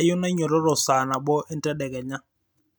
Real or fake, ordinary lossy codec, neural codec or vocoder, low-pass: real; none; none; none